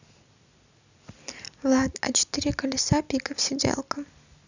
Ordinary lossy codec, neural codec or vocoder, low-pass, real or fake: none; none; 7.2 kHz; real